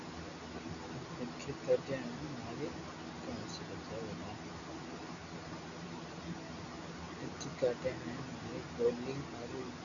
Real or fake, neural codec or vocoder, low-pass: real; none; 7.2 kHz